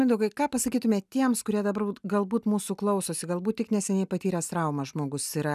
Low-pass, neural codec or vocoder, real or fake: 14.4 kHz; none; real